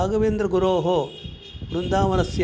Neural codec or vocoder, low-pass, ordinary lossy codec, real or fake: none; none; none; real